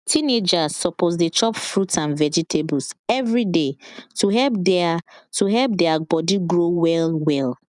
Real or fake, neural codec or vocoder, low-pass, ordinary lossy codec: real; none; 10.8 kHz; none